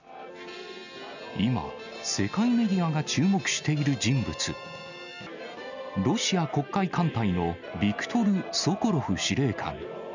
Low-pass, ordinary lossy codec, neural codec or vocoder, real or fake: 7.2 kHz; none; none; real